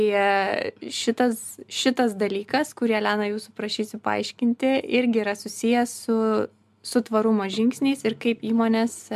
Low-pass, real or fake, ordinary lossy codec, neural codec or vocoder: 14.4 kHz; real; MP3, 96 kbps; none